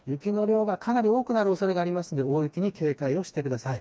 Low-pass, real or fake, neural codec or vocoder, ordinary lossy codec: none; fake; codec, 16 kHz, 2 kbps, FreqCodec, smaller model; none